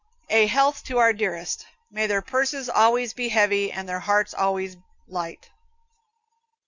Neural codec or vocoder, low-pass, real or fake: none; 7.2 kHz; real